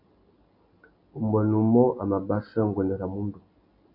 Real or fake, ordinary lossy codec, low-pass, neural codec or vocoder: real; AAC, 48 kbps; 5.4 kHz; none